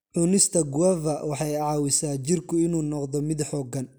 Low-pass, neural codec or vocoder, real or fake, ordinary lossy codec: none; none; real; none